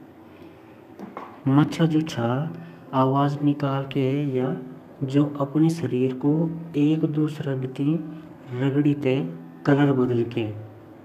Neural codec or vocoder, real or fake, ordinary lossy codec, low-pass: codec, 32 kHz, 1.9 kbps, SNAC; fake; none; 14.4 kHz